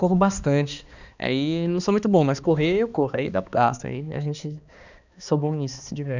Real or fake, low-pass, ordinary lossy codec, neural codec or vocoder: fake; 7.2 kHz; none; codec, 16 kHz, 2 kbps, X-Codec, HuBERT features, trained on balanced general audio